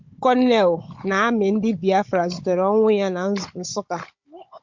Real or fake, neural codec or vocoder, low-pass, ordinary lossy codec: fake; codec, 16 kHz, 16 kbps, FunCodec, trained on Chinese and English, 50 frames a second; 7.2 kHz; MP3, 48 kbps